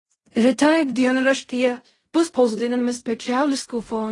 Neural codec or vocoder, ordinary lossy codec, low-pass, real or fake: codec, 16 kHz in and 24 kHz out, 0.4 kbps, LongCat-Audio-Codec, fine tuned four codebook decoder; AAC, 32 kbps; 10.8 kHz; fake